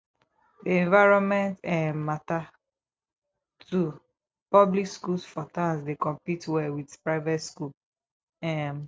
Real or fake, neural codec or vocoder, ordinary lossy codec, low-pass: real; none; none; none